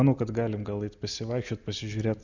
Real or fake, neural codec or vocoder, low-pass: real; none; 7.2 kHz